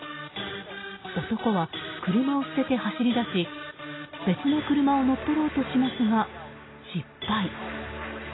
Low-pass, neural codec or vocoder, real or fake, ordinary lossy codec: 7.2 kHz; none; real; AAC, 16 kbps